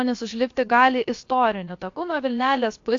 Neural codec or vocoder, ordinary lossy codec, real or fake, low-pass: codec, 16 kHz, about 1 kbps, DyCAST, with the encoder's durations; AAC, 48 kbps; fake; 7.2 kHz